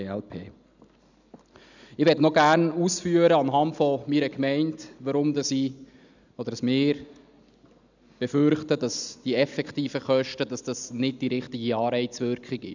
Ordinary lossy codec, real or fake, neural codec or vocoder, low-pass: none; real; none; 7.2 kHz